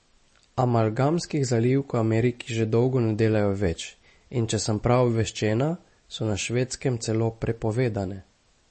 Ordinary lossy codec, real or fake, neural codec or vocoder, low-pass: MP3, 32 kbps; real; none; 10.8 kHz